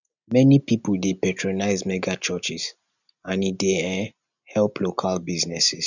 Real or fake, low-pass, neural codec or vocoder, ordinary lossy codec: real; 7.2 kHz; none; none